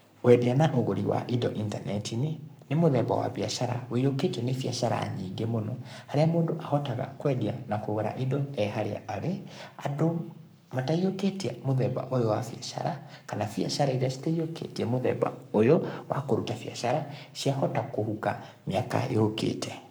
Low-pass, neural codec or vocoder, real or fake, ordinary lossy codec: none; codec, 44.1 kHz, 7.8 kbps, Pupu-Codec; fake; none